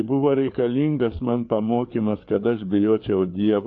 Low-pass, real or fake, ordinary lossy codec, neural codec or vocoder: 7.2 kHz; fake; AAC, 48 kbps; codec, 16 kHz, 4 kbps, FreqCodec, larger model